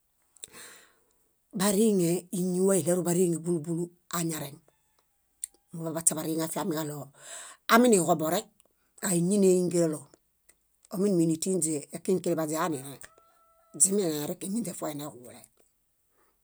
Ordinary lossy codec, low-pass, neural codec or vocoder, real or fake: none; none; none; real